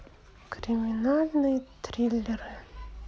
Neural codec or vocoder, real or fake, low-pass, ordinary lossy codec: none; real; none; none